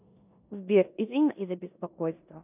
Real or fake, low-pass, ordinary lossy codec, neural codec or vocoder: fake; 3.6 kHz; AAC, 32 kbps; codec, 16 kHz in and 24 kHz out, 0.9 kbps, LongCat-Audio-Codec, four codebook decoder